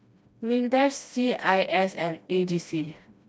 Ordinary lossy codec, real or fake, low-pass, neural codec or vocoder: none; fake; none; codec, 16 kHz, 1 kbps, FreqCodec, smaller model